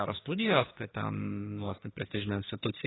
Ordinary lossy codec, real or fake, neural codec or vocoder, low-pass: AAC, 16 kbps; fake; codec, 44.1 kHz, 2.6 kbps, SNAC; 7.2 kHz